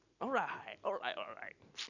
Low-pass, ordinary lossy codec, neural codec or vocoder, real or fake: 7.2 kHz; none; none; real